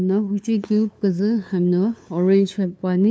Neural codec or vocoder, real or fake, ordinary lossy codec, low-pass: codec, 16 kHz, 2 kbps, FunCodec, trained on LibriTTS, 25 frames a second; fake; none; none